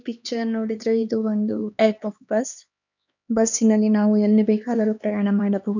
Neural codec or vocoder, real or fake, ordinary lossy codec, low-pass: codec, 16 kHz, 2 kbps, X-Codec, HuBERT features, trained on LibriSpeech; fake; none; 7.2 kHz